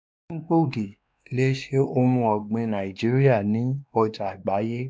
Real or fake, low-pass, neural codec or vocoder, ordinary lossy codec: fake; none; codec, 16 kHz, 2 kbps, X-Codec, WavLM features, trained on Multilingual LibriSpeech; none